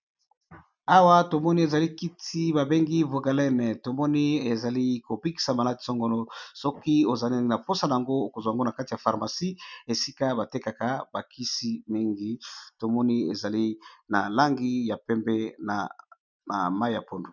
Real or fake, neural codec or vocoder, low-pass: real; none; 7.2 kHz